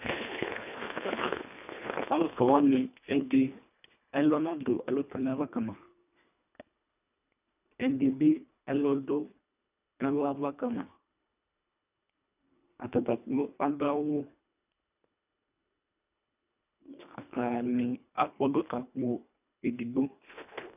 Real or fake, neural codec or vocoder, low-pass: fake; codec, 24 kHz, 1.5 kbps, HILCodec; 3.6 kHz